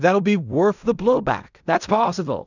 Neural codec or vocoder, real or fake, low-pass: codec, 16 kHz in and 24 kHz out, 0.4 kbps, LongCat-Audio-Codec, fine tuned four codebook decoder; fake; 7.2 kHz